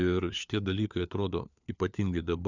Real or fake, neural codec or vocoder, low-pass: fake; codec, 16 kHz, 4 kbps, FunCodec, trained on LibriTTS, 50 frames a second; 7.2 kHz